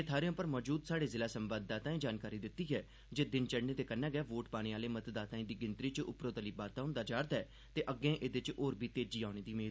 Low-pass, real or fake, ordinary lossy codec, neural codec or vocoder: none; real; none; none